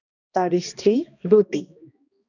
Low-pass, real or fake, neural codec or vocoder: 7.2 kHz; fake; codec, 16 kHz, 1 kbps, X-Codec, HuBERT features, trained on balanced general audio